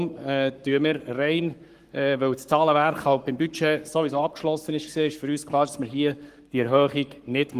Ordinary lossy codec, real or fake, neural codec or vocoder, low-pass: Opus, 24 kbps; fake; codec, 44.1 kHz, 7.8 kbps, Pupu-Codec; 14.4 kHz